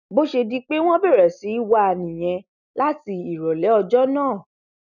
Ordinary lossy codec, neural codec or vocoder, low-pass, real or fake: none; none; 7.2 kHz; real